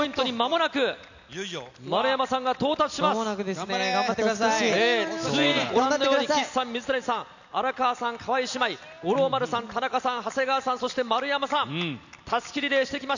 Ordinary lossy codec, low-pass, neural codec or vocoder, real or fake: none; 7.2 kHz; none; real